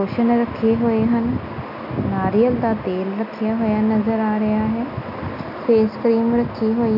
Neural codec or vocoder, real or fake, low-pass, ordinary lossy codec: none; real; 5.4 kHz; none